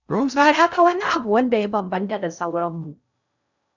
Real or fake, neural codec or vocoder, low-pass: fake; codec, 16 kHz in and 24 kHz out, 0.6 kbps, FocalCodec, streaming, 2048 codes; 7.2 kHz